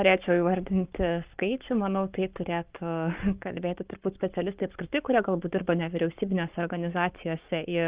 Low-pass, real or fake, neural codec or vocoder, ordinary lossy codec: 3.6 kHz; fake; codec, 44.1 kHz, 7.8 kbps, Pupu-Codec; Opus, 32 kbps